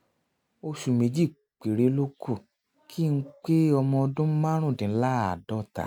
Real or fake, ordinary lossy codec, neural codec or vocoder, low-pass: real; none; none; 19.8 kHz